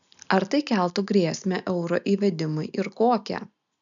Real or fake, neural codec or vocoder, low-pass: real; none; 7.2 kHz